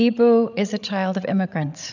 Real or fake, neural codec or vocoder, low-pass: fake; codec, 16 kHz, 16 kbps, FreqCodec, larger model; 7.2 kHz